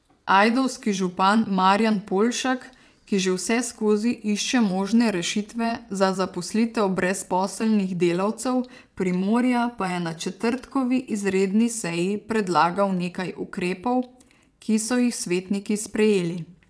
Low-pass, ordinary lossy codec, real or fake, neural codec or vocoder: none; none; fake; vocoder, 22.05 kHz, 80 mel bands, WaveNeXt